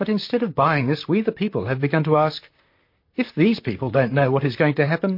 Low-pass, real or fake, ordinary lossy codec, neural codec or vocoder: 5.4 kHz; fake; MP3, 32 kbps; vocoder, 44.1 kHz, 128 mel bands, Pupu-Vocoder